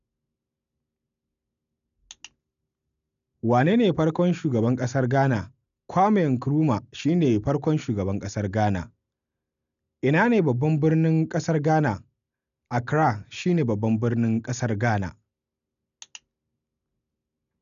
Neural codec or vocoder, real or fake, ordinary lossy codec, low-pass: none; real; none; 7.2 kHz